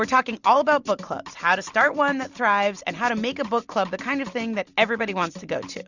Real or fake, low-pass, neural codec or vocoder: real; 7.2 kHz; none